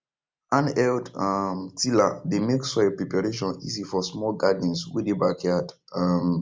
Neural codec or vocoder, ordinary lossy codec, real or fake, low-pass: none; none; real; none